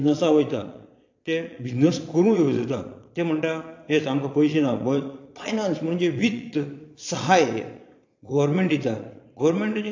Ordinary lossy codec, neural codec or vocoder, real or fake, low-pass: AAC, 48 kbps; vocoder, 22.05 kHz, 80 mel bands, Vocos; fake; 7.2 kHz